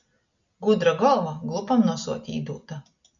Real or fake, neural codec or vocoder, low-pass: real; none; 7.2 kHz